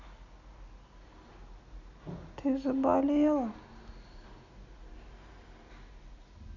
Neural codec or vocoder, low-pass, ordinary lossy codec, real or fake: none; 7.2 kHz; none; real